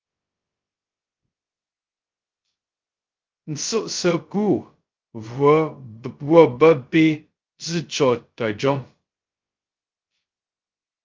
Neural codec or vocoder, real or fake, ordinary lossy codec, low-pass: codec, 16 kHz, 0.2 kbps, FocalCodec; fake; Opus, 24 kbps; 7.2 kHz